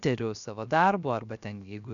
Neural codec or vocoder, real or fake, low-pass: codec, 16 kHz, 0.7 kbps, FocalCodec; fake; 7.2 kHz